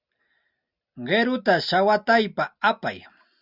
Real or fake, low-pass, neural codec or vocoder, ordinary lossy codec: real; 5.4 kHz; none; Opus, 64 kbps